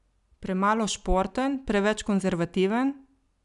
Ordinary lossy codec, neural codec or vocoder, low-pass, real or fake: none; none; 10.8 kHz; real